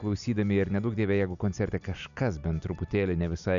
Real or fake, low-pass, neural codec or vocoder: real; 7.2 kHz; none